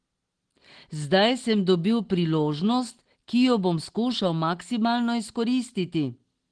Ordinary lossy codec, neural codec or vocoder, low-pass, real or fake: Opus, 16 kbps; none; 9.9 kHz; real